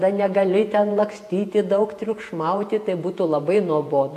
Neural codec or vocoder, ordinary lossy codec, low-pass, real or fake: vocoder, 48 kHz, 128 mel bands, Vocos; AAC, 64 kbps; 14.4 kHz; fake